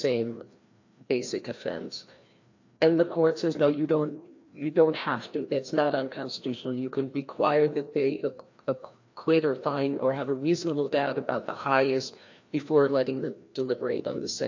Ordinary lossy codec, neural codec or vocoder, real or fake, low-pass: AAC, 48 kbps; codec, 16 kHz, 1 kbps, FreqCodec, larger model; fake; 7.2 kHz